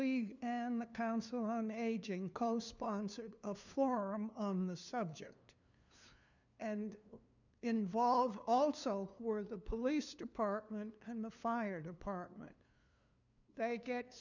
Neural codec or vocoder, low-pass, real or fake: codec, 16 kHz, 2 kbps, FunCodec, trained on LibriTTS, 25 frames a second; 7.2 kHz; fake